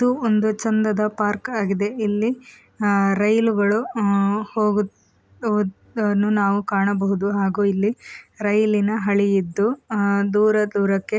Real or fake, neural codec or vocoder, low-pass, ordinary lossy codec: real; none; none; none